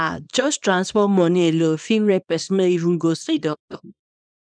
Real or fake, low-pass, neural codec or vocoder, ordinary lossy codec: fake; 9.9 kHz; codec, 24 kHz, 0.9 kbps, WavTokenizer, small release; none